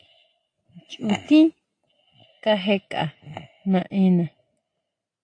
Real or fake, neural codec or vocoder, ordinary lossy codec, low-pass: real; none; AAC, 48 kbps; 9.9 kHz